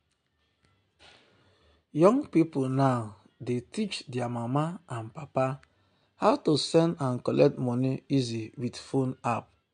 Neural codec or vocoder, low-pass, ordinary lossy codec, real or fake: none; 9.9 kHz; MP3, 64 kbps; real